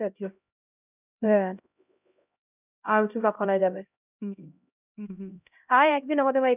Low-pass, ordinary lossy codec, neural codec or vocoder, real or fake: 3.6 kHz; none; codec, 16 kHz, 0.5 kbps, X-Codec, HuBERT features, trained on LibriSpeech; fake